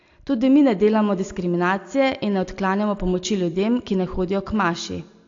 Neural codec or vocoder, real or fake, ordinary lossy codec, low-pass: none; real; AAC, 48 kbps; 7.2 kHz